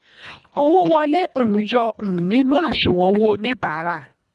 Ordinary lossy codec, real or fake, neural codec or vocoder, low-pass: none; fake; codec, 24 kHz, 1.5 kbps, HILCodec; none